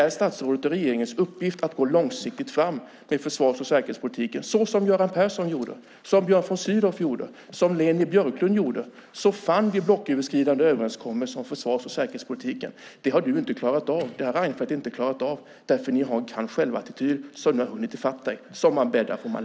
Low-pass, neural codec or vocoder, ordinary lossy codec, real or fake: none; none; none; real